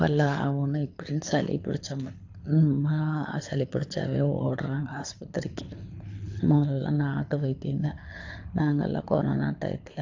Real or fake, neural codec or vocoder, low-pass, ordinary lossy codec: fake; codec, 24 kHz, 6 kbps, HILCodec; 7.2 kHz; AAC, 48 kbps